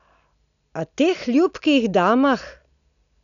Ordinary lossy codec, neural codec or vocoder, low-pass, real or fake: none; none; 7.2 kHz; real